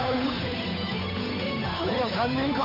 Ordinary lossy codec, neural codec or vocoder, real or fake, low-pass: none; codec, 16 kHz, 8 kbps, FunCodec, trained on Chinese and English, 25 frames a second; fake; 5.4 kHz